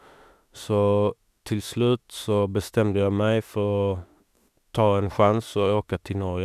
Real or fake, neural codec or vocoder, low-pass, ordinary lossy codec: fake; autoencoder, 48 kHz, 32 numbers a frame, DAC-VAE, trained on Japanese speech; 14.4 kHz; none